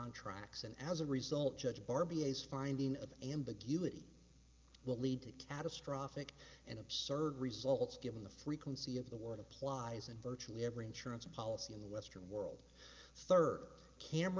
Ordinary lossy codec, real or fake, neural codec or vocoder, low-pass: Opus, 24 kbps; real; none; 7.2 kHz